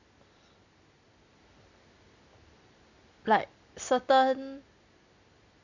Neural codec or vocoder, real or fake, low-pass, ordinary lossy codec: none; real; 7.2 kHz; none